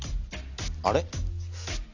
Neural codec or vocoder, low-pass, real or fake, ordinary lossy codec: none; 7.2 kHz; real; none